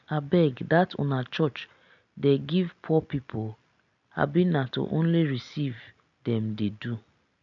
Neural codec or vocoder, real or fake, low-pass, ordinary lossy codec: none; real; 7.2 kHz; none